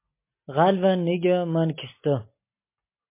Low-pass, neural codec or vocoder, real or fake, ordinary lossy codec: 3.6 kHz; none; real; MP3, 24 kbps